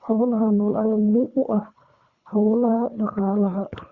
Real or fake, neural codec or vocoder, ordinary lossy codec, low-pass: fake; codec, 24 kHz, 3 kbps, HILCodec; Opus, 64 kbps; 7.2 kHz